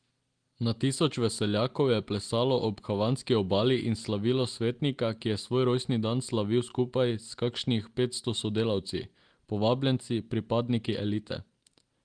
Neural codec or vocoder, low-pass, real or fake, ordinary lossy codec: none; 9.9 kHz; real; Opus, 24 kbps